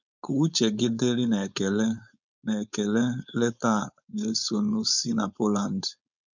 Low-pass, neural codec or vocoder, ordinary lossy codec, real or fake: 7.2 kHz; codec, 16 kHz, 4.8 kbps, FACodec; none; fake